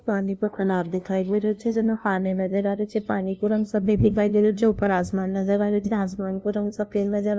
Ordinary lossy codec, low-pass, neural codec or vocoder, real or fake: none; none; codec, 16 kHz, 0.5 kbps, FunCodec, trained on LibriTTS, 25 frames a second; fake